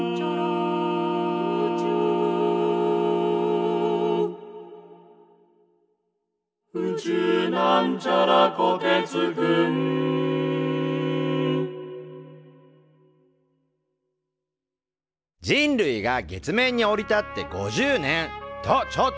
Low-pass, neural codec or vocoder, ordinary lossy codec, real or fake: none; none; none; real